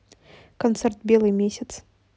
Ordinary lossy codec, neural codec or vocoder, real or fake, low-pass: none; none; real; none